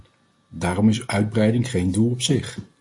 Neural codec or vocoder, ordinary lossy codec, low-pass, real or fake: none; MP3, 48 kbps; 10.8 kHz; real